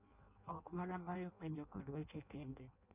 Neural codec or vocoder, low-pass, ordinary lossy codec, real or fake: codec, 16 kHz in and 24 kHz out, 0.6 kbps, FireRedTTS-2 codec; 3.6 kHz; none; fake